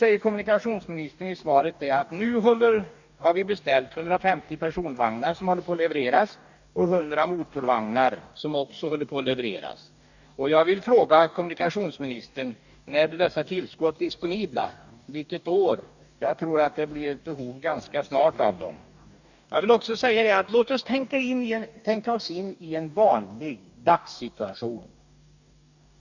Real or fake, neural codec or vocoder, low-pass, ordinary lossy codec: fake; codec, 44.1 kHz, 2.6 kbps, DAC; 7.2 kHz; none